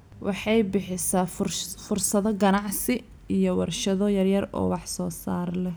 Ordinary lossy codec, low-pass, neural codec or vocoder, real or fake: none; none; none; real